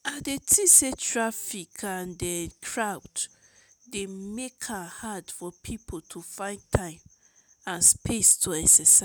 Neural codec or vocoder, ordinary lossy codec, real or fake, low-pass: none; none; real; none